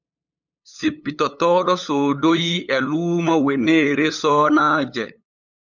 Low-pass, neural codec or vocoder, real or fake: 7.2 kHz; codec, 16 kHz, 8 kbps, FunCodec, trained on LibriTTS, 25 frames a second; fake